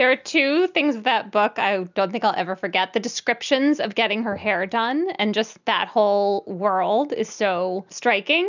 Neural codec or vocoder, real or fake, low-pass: none; real; 7.2 kHz